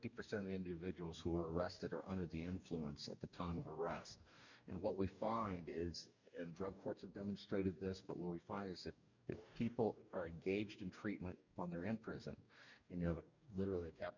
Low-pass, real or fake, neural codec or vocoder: 7.2 kHz; fake; codec, 44.1 kHz, 2.6 kbps, DAC